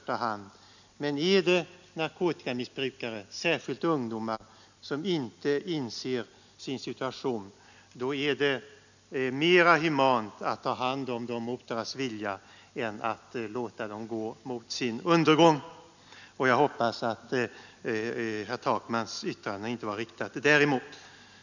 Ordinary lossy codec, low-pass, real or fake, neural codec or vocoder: none; 7.2 kHz; real; none